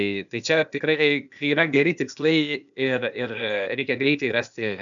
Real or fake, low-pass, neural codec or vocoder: fake; 7.2 kHz; codec, 16 kHz, 0.8 kbps, ZipCodec